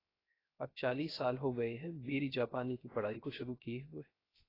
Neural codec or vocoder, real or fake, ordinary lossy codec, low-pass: codec, 16 kHz, 0.3 kbps, FocalCodec; fake; AAC, 24 kbps; 5.4 kHz